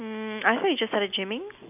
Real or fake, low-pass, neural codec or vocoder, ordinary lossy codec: real; 3.6 kHz; none; none